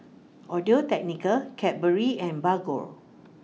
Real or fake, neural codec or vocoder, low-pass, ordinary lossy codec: real; none; none; none